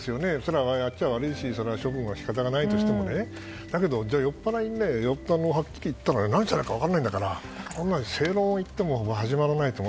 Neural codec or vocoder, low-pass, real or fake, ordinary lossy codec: none; none; real; none